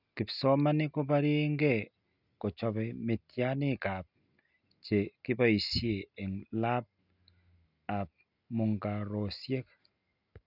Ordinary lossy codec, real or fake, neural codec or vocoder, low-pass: none; real; none; 5.4 kHz